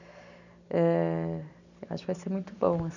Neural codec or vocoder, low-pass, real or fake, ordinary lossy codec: none; 7.2 kHz; real; none